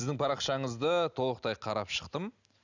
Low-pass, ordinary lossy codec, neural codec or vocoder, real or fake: 7.2 kHz; none; none; real